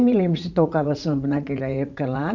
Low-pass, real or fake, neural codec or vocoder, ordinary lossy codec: 7.2 kHz; fake; vocoder, 44.1 kHz, 80 mel bands, Vocos; none